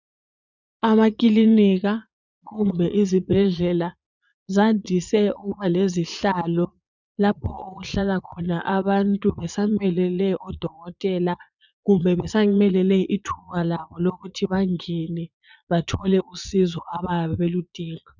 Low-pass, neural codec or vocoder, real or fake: 7.2 kHz; vocoder, 22.05 kHz, 80 mel bands, Vocos; fake